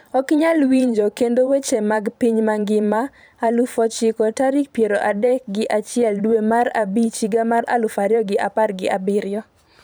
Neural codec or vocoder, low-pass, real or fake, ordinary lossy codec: vocoder, 44.1 kHz, 128 mel bands every 512 samples, BigVGAN v2; none; fake; none